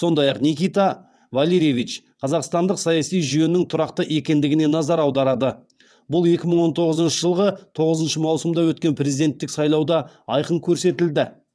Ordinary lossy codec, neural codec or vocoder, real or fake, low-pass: none; vocoder, 22.05 kHz, 80 mel bands, WaveNeXt; fake; none